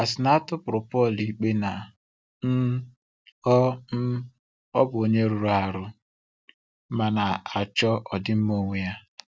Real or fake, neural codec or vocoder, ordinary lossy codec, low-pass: real; none; none; none